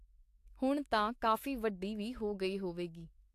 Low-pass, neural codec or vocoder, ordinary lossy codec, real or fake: 14.4 kHz; autoencoder, 48 kHz, 128 numbers a frame, DAC-VAE, trained on Japanese speech; AAC, 64 kbps; fake